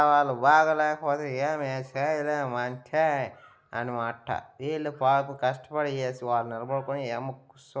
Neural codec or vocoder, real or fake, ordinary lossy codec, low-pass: none; real; none; none